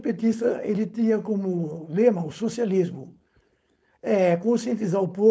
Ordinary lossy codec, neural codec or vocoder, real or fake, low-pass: none; codec, 16 kHz, 4.8 kbps, FACodec; fake; none